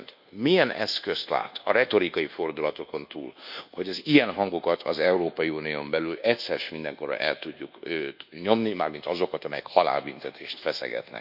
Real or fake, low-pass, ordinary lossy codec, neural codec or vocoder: fake; 5.4 kHz; none; codec, 24 kHz, 1.2 kbps, DualCodec